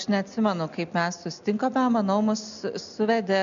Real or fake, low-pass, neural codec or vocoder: real; 7.2 kHz; none